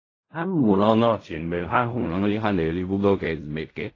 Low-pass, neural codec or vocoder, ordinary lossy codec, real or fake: 7.2 kHz; codec, 16 kHz in and 24 kHz out, 0.4 kbps, LongCat-Audio-Codec, fine tuned four codebook decoder; AAC, 32 kbps; fake